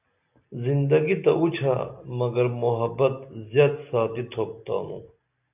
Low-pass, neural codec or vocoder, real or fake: 3.6 kHz; none; real